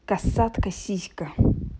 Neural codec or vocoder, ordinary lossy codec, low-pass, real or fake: none; none; none; real